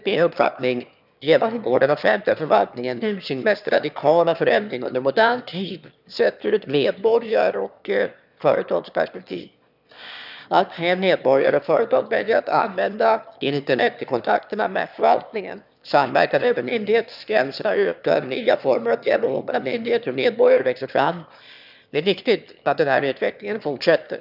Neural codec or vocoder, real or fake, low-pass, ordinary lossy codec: autoencoder, 22.05 kHz, a latent of 192 numbers a frame, VITS, trained on one speaker; fake; 5.4 kHz; none